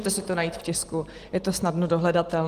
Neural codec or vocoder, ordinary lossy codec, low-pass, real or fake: none; Opus, 24 kbps; 14.4 kHz; real